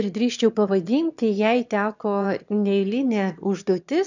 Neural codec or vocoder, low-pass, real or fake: autoencoder, 22.05 kHz, a latent of 192 numbers a frame, VITS, trained on one speaker; 7.2 kHz; fake